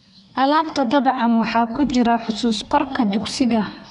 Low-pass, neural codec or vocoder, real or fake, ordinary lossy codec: 10.8 kHz; codec, 24 kHz, 1 kbps, SNAC; fake; none